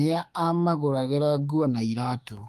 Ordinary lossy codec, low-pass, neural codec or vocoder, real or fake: Opus, 32 kbps; 14.4 kHz; autoencoder, 48 kHz, 32 numbers a frame, DAC-VAE, trained on Japanese speech; fake